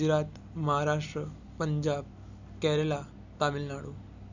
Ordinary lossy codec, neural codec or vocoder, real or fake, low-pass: none; none; real; 7.2 kHz